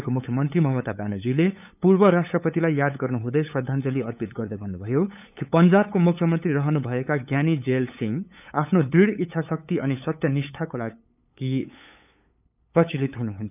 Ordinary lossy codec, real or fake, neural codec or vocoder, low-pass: none; fake; codec, 16 kHz, 8 kbps, FunCodec, trained on LibriTTS, 25 frames a second; 3.6 kHz